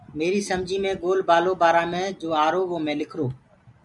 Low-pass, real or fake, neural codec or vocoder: 10.8 kHz; real; none